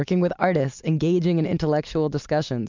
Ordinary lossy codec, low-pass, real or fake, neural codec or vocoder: MP3, 64 kbps; 7.2 kHz; real; none